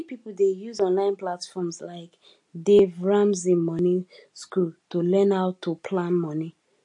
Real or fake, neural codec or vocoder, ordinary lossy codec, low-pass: real; none; MP3, 48 kbps; 10.8 kHz